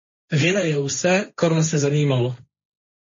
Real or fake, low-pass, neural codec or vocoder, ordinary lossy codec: fake; 7.2 kHz; codec, 16 kHz, 1.1 kbps, Voila-Tokenizer; MP3, 32 kbps